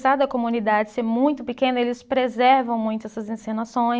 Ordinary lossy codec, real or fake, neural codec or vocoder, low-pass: none; real; none; none